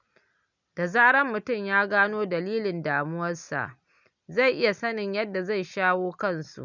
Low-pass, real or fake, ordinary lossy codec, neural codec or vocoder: 7.2 kHz; real; none; none